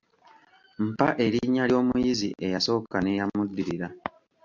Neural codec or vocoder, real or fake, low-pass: none; real; 7.2 kHz